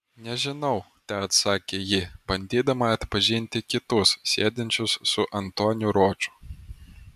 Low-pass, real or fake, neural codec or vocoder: 14.4 kHz; real; none